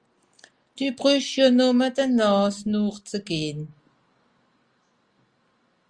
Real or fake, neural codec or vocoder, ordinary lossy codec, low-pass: real; none; Opus, 32 kbps; 9.9 kHz